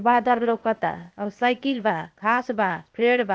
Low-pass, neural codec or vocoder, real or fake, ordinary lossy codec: none; codec, 16 kHz, 0.8 kbps, ZipCodec; fake; none